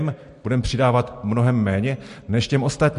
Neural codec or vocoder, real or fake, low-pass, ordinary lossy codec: none; real; 10.8 kHz; MP3, 48 kbps